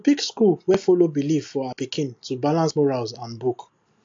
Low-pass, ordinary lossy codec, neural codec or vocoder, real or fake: 7.2 kHz; AAC, 48 kbps; none; real